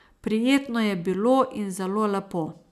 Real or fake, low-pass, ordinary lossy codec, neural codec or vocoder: real; 14.4 kHz; none; none